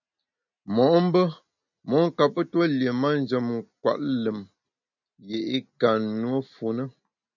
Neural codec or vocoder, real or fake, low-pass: none; real; 7.2 kHz